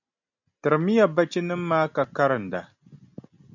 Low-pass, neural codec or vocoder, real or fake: 7.2 kHz; none; real